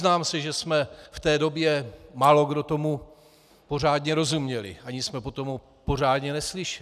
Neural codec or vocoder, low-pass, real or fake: none; 14.4 kHz; real